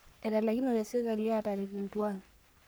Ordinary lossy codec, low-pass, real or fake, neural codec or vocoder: none; none; fake; codec, 44.1 kHz, 3.4 kbps, Pupu-Codec